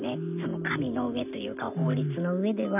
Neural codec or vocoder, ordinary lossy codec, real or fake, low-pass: none; none; real; 3.6 kHz